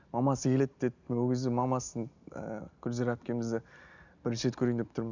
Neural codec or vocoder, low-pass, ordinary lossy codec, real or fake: none; 7.2 kHz; none; real